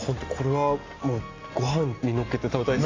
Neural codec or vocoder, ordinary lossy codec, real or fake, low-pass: none; AAC, 32 kbps; real; 7.2 kHz